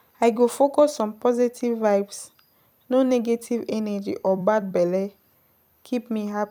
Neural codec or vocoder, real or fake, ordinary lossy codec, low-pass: vocoder, 44.1 kHz, 128 mel bands every 256 samples, BigVGAN v2; fake; none; 19.8 kHz